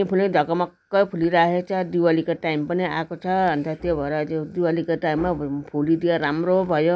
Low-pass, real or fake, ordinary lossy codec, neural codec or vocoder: none; real; none; none